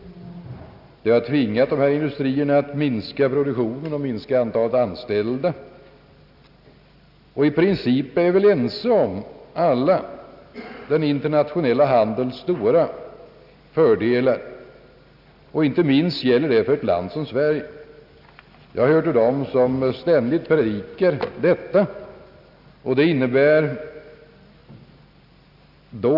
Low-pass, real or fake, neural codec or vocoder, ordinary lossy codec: 5.4 kHz; real; none; none